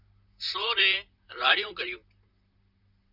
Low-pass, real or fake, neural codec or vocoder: 5.4 kHz; fake; vocoder, 44.1 kHz, 128 mel bands, Pupu-Vocoder